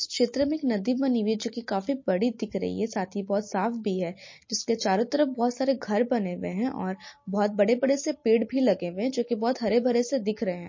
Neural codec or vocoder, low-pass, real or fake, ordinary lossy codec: none; 7.2 kHz; real; MP3, 32 kbps